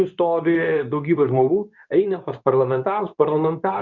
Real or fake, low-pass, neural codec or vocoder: fake; 7.2 kHz; codec, 24 kHz, 0.9 kbps, WavTokenizer, medium speech release version 2